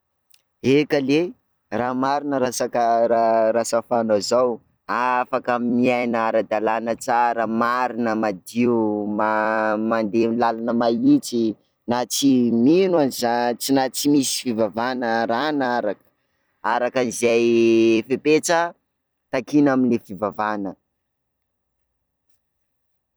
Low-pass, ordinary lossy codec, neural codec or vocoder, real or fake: none; none; vocoder, 44.1 kHz, 128 mel bands every 256 samples, BigVGAN v2; fake